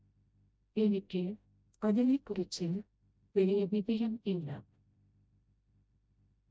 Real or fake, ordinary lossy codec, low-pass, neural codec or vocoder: fake; none; none; codec, 16 kHz, 0.5 kbps, FreqCodec, smaller model